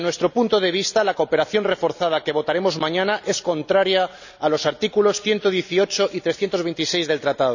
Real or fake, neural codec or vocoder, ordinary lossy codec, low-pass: real; none; none; 7.2 kHz